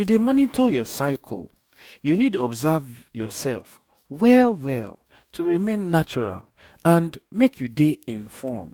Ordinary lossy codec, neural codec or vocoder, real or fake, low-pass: none; codec, 44.1 kHz, 2.6 kbps, DAC; fake; 19.8 kHz